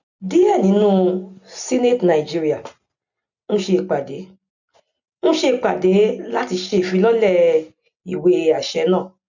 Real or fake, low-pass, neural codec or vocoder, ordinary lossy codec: real; 7.2 kHz; none; none